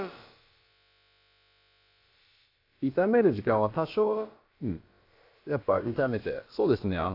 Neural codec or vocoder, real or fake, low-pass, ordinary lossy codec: codec, 16 kHz, about 1 kbps, DyCAST, with the encoder's durations; fake; 5.4 kHz; AAC, 32 kbps